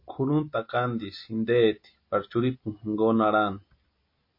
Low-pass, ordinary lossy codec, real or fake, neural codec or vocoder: 5.4 kHz; MP3, 32 kbps; real; none